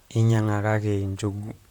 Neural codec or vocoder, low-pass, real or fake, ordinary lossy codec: vocoder, 44.1 kHz, 128 mel bands, Pupu-Vocoder; 19.8 kHz; fake; none